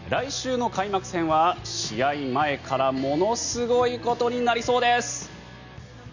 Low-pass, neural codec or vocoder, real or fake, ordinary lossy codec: 7.2 kHz; none; real; none